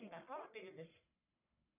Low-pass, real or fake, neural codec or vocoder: 3.6 kHz; fake; codec, 44.1 kHz, 1.7 kbps, Pupu-Codec